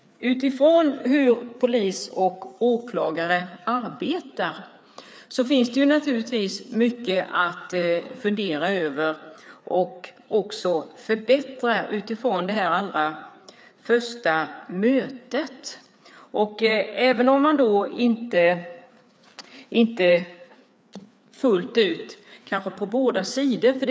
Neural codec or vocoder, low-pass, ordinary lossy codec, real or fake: codec, 16 kHz, 4 kbps, FreqCodec, larger model; none; none; fake